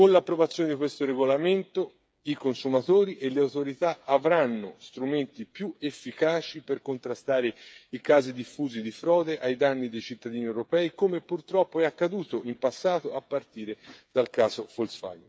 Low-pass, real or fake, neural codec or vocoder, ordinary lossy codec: none; fake; codec, 16 kHz, 8 kbps, FreqCodec, smaller model; none